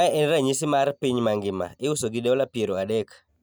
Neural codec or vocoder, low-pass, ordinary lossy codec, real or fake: none; none; none; real